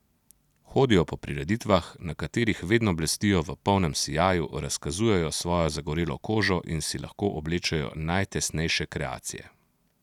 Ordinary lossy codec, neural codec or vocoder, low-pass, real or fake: none; none; 19.8 kHz; real